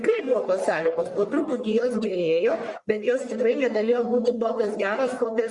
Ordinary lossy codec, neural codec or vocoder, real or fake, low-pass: Opus, 64 kbps; codec, 44.1 kHz, 1.7 kbps, Pupu-Codec; fake; 10.8 kHz